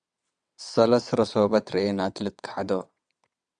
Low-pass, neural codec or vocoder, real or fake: 9.9 kHz; vocoder, 22.05 kHz, 80 mel bands, WaveNeXt; fake